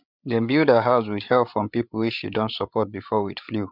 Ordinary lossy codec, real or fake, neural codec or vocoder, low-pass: none; fake; codec, 16 kHz, 8 kbps, FreqCodec, larger model; 5.4 kHz